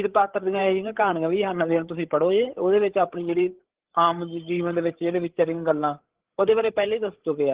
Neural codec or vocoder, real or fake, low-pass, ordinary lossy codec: codec, 16 kHz, 8 kbps, FreqCodec, larger model; fake; 3.6 kHz; Opus, 16 kbps